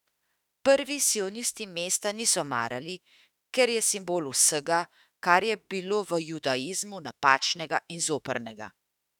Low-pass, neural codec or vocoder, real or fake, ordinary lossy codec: 19.8 kHz; autoencoder, 48 kHz, 32 numbers a frame, DAC-VAE, trained on Japanese speech; fake; none